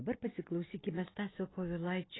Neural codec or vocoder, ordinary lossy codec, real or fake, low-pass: none; AAC, 16 kbps; real; 7.2 kHz